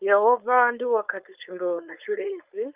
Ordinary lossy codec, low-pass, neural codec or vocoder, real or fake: Opus, 24 kbps; 3.6 kHz; codec, 16 kHz, 4.8 kbps, FACodec; fake